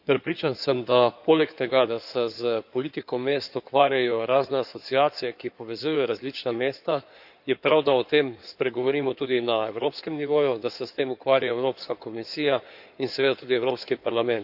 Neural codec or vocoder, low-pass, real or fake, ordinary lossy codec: codec, 16 kHz in and 24 kHz out, 2.2 kbps, FireRedTTS-2 codec; 5.4 kHz; fake; none